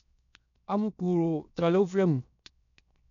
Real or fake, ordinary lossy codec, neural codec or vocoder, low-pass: fake; none; codec, 16 kHz, 0.7 kbps, FocalCodec; 7.2 kHz